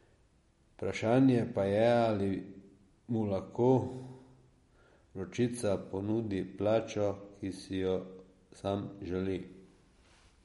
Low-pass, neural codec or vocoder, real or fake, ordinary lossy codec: 19.8 kHz; none; real; MP3, 48 kbps